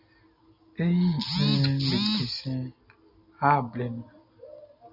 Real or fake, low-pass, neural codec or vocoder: real; 5.4 kHz; none